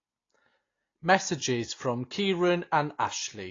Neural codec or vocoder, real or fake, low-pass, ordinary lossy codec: none; real; 7.2 kHz; AAC, 32 kbps